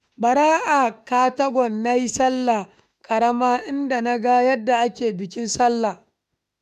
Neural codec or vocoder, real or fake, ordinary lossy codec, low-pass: autoencoder, 48 kHz, 32 numbers a frame, DAC-VAE, trained on Japanese speech; fake; none; 14.4 kHz